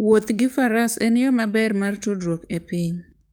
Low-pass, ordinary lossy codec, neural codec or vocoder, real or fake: none; none; codec, 44.1 kHz, 7.8 kbps, DAC; fake